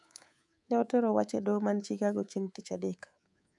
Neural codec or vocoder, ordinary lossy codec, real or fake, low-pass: codec, 24 kHz, 3.1 kbps, DualCodec; none; fake; 10.8 kHz